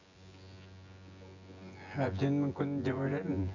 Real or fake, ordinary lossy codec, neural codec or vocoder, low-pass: fake; none; vocoder, 24 kHz, 100 mel bands, Vocos; 7.2 kHz